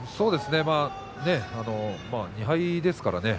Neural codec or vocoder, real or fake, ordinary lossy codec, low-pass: none; real; none; none